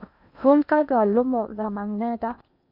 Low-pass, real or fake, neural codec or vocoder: 5.4 kHz; fake; codec, 16 kHz in and 24 kHz out, 0.8 kbps, FocalCodec, streaming, 65536 codes